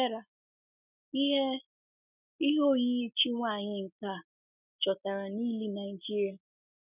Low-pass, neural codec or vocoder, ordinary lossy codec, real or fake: 3.6 kHz; none; none; real